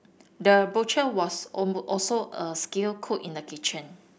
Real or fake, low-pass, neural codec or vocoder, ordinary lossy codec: real; none; none; none